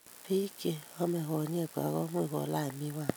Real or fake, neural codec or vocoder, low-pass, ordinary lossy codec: real; none; none; none